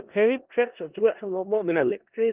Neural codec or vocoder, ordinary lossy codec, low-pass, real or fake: codec, 16 kHz in and 24 kHz out, 0.4 kbps, LongCat-Audio-Codec, four codebook decoder; Opus, 32 kbps; 3.6 kHz; fake